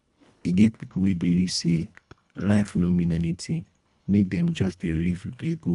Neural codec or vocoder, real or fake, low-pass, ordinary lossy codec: codec, 24 kHz, 1.5 kbps, HILCodec; fake; 10.8 kHz; none